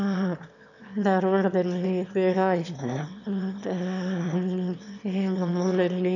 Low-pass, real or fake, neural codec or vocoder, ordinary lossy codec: 7.2 kHz; fake; autoencoder, 22.05 kHz, a latent of 192 numbers a frame, VITS, trained on one speaker; none